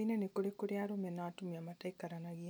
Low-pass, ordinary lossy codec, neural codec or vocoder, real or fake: none; none; none; real